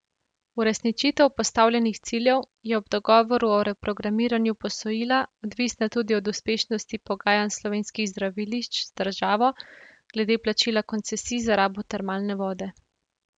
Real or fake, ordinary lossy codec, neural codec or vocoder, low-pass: real; none; none; 9.9 kHz